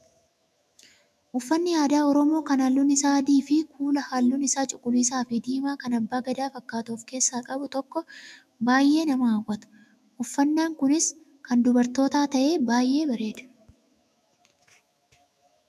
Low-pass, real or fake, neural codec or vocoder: 14.4 kHz; fake; autoencoder, 48 kHz, 128 numbers a frame, DAC-VAE, trained on Japanese speech